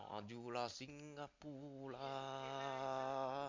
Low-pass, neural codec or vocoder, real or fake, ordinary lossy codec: 7.2 kHz; none; real; MP3, 48 kbps